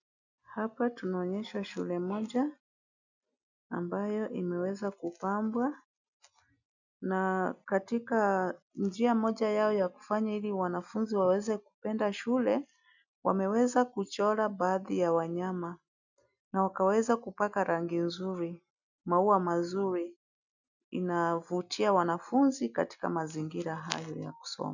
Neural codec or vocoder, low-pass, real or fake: none; 7.2 kHz; real